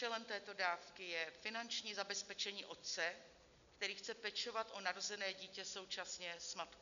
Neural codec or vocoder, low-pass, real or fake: none; 7.2 kHz; real